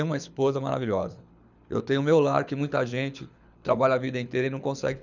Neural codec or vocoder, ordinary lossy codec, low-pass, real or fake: codec, 24 kHz, 6 kbps, HILCodec; none; 7.2 kHz; fake